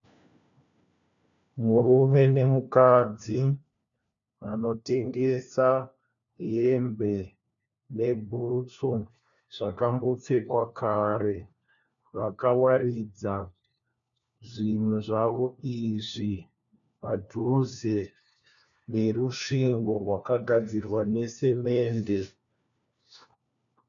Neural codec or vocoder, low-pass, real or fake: codec, 16 kHz, 1 kbps, FunCodec, trained on LibriTTS, 50 frames a second; 7.2 kHz; fake